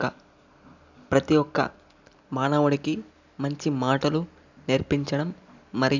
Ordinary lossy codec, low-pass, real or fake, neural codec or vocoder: none; 7.2 kHz; real; none